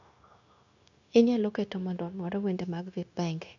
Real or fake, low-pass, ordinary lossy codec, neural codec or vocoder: fake; 7.2 kHz; none; codec, 16 kHz, 0.9 kbps, LongCat-Audio-Codec